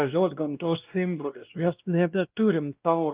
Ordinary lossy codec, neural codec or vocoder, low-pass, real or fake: Opus, 24 kbps; codec, 16 kHz, 1 kbps, X-Codec, WavLM features, trained on Multilingual LibriSpeech; 3.6 kHz; fake